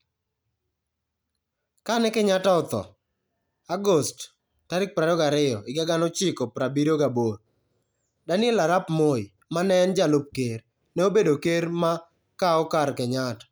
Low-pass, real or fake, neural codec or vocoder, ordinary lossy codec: none; real; none; none